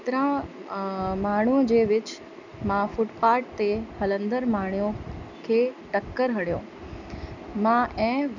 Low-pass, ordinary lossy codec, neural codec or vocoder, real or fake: 7.2 kHz; none; none; real